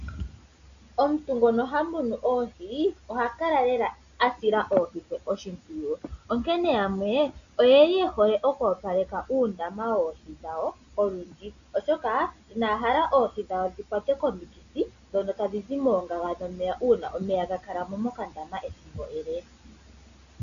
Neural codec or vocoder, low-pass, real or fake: none; 7.2 kHz; real